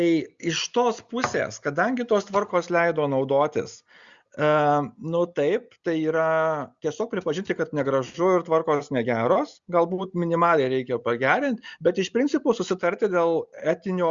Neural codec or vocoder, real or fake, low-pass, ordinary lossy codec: none; real; 7.2 kHz; Opus, 64 kbps